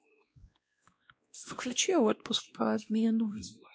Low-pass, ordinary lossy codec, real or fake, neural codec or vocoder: none; none; fake; codec, 16 kHz, 1 kbps, X-Codec, WavLM features, trained on Multilingual LibriSpeech